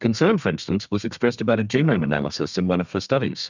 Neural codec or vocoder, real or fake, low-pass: codec, 32 kHz, 1.9 kbps, SNAC; fake; 7.2 kHz